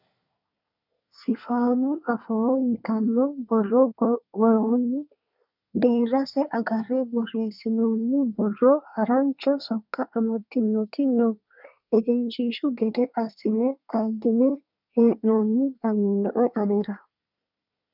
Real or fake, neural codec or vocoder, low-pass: fake; codec, 24 kHz, 1 kbps, SNAC; 5.4 kHz